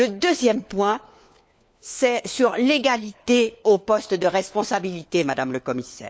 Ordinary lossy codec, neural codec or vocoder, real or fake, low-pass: none; codec, 16 kHz, 4 kbps, FunCodec, trained on LibriTTS, 50 frames a second; fake; none